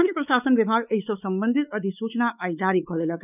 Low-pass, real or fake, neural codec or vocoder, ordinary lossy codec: 3.6 kHz; fake; codec, 16 kHz, 4 kbps, X-Codec, WavLM features, trained on Multilingual LibriSpeech; none